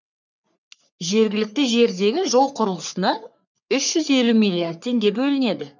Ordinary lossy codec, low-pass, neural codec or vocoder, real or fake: none; 7.2 kHz; codec, 44.1 kHz, 3.4 kbps, Pupu-Codec; fake